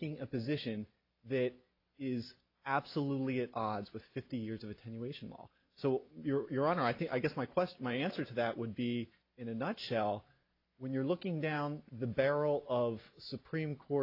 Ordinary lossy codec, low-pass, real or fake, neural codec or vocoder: AAC, 32 kbps; 5.4 kHz; real; none